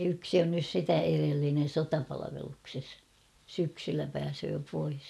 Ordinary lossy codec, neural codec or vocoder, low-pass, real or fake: none; none; none; real